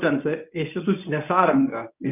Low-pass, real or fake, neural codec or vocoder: 3.6 kHz; fake; codec, 24 kHz, 0.9 kbps, WavTokenizer, medium speech release version 1